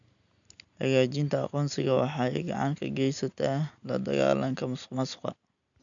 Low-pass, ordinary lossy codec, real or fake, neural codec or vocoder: 7.2 kHz; none; real; none